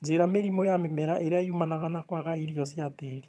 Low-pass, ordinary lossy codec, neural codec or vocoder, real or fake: none; none; vocoder, 22.05 kHz, 80 mel bands, HiFi-GAN; fake